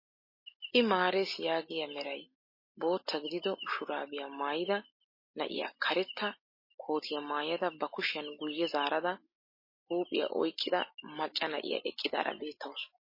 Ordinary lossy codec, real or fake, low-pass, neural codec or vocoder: MP3, 24 kbps; real; 5.4 kHz; none